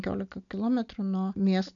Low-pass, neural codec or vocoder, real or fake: 7.2 kHz; none; real